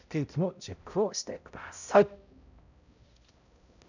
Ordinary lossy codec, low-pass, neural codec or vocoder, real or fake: none; 7.2 kHz; codec, 16 kHz, 0.5 kbps, X-Codec, HuBERT features, trained on balanced general audio; fake